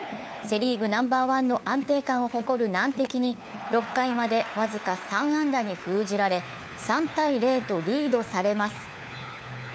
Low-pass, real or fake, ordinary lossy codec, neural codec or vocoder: none; fake; none; codec, 16 kHz, 4 kbps, FunCodec, trained on LibriTTS, 50 frames a second